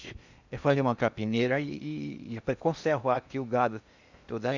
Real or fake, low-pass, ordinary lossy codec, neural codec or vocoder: fake; 7.2 kHz; Opus, 64 kbps; codec, 16 kHz in and 24 kHz out, 0.8 kbps, FocalCodec, streaming, 65536 codes